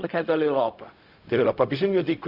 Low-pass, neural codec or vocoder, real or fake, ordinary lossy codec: 5.4 kHz; codec, 16 kHz, 0.4 kbps, LongCat-Audio-Codec; fake; AAC, 48 kbps